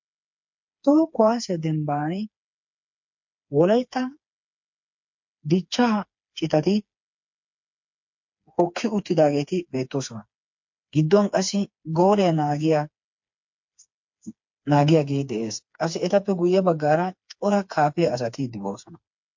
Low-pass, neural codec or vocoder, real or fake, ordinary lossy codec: 7.2 kHz; codec, 16 kHz, 4 kbps, FreqCodec, smaller model; fake; MP3, 48 kbps